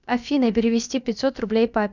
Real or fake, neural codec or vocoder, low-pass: fake; codec, 16 kHz, about 1 kbps, DyCAST, with the encoder's durations; 7.2 kHz